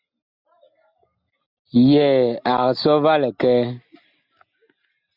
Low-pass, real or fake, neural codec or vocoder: 5.4 kHz; real; none